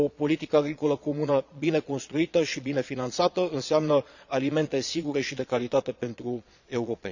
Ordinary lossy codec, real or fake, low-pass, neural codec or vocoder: none; fake; 7.2 kHz; vocoder, 22.05 kHz, 80 mel bands, Vocos